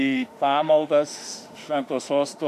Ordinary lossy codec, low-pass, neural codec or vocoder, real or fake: MP3, 96 kbps; 14.4 kHz; autoencoder, 48 kHz, 32 numbers a frame, DAC-VAE, trained on Japanese speech; fake